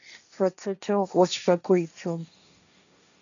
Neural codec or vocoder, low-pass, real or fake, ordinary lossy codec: codec, 16 kHz, 1.1 kbps, Voila-Tokenizer; 7.2 kHz; fake; MP3, 96 kbps